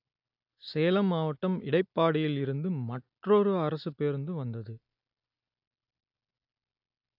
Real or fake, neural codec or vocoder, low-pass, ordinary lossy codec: real; none; 5.4 kHz; AAC, 48 kbps